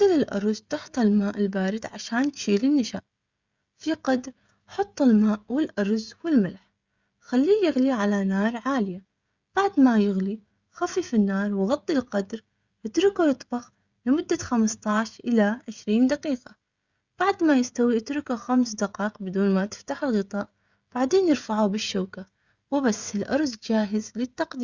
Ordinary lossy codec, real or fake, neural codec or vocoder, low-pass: Opus, 64 kbps; fake; codec, 16 kHz, 16 kbps, FreqCodec, smaller model; 7.2 kHz